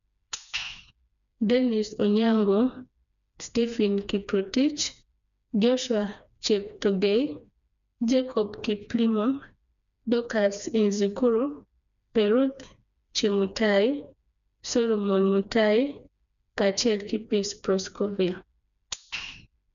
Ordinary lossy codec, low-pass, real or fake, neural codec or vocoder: none; 7.2 kHz; fake; codec, 16 kHz, 2 kbps, FreqCodec, smaller model